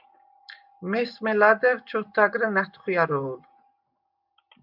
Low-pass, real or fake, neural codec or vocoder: 5.4 kHz; real; none